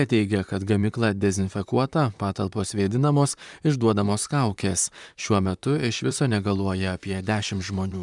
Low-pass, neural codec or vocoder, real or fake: 10.8 kHz; vocoder, 44.1 kHz, 128 mel bands, Pupu-Vocoder; fake